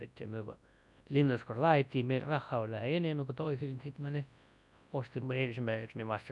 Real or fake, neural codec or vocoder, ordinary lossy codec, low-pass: fake; codec, 24 kHz, 0.9 kbps, WavTokenizer, large speech release; none; 10.8 kHz